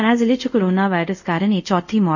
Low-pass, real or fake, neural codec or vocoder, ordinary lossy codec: 7.2 kHz; fake; codec, 24 kHz, 0.5 kbps, DualCodec; none